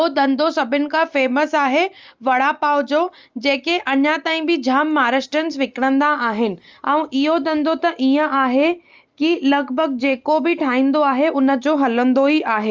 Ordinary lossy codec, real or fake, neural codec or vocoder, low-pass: Opus, 32 kbps; real; none; 7.2 kHz